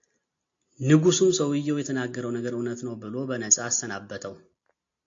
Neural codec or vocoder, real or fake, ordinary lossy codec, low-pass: none; real; AAC, 48 kbps; 7.2 kHz